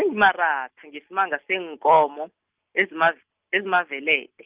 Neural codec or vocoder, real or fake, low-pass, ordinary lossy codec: none; real; 3.6 kHz; Opus, 32 kbps